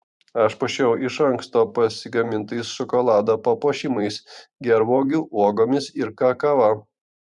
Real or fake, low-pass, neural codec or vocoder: fake; 10.8 kHz; vocoder, 44.1 kHz, 128 mel bands every 512 samples, BigVGAN v2